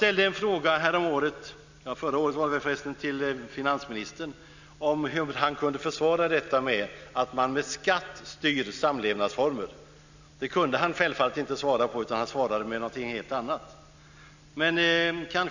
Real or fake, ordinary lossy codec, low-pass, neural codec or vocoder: real; none; 7.2 kHz; none